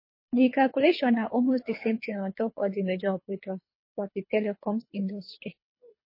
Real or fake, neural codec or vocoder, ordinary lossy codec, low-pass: fake; codec, 24 kHz, 3 kbps, HILCodec; MP3, 24 kbps; 5.4 kHz